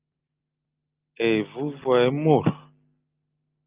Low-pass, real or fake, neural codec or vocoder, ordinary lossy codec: 3.6 kHz; real; none; Opus, 32 kbps